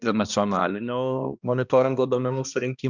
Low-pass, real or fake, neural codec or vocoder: 7.2 kHz; fake; codec, 16 kHz, 1 kbps, X-Codec, HuBERT features, trained on balanced general audio